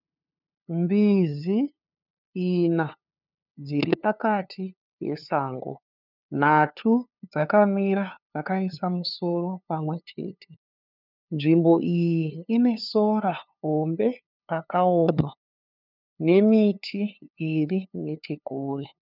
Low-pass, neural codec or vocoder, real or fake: 5.4 kHz; codec, 16 kHz, 2 kbps, FunCodec, trained on LibriTTS, 25 frames a second; fake